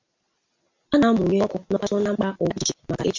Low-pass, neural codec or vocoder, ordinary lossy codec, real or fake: 7.2 kHz; none; MP3, 64 kbps; real